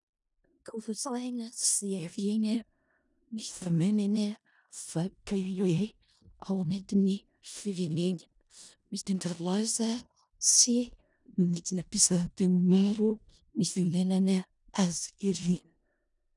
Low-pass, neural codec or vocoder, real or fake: 10.8 kHz; codec, 16 kHz in and 24 kHz out, 0.4 kbps, LongCat-Audio-Codec, four codebook decoder; fake